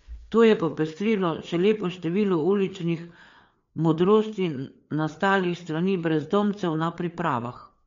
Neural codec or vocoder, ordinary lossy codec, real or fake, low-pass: codec, 16 kHz, 4 kbps, FreqCodec, larger model; MP3, 48 kbps; fake; 7.2 kHz